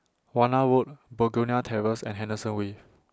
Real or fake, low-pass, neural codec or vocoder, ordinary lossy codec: real; none; none; none